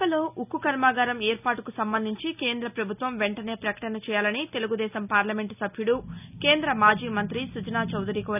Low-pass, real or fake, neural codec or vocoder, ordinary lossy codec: 3.6 kHz; real; none; none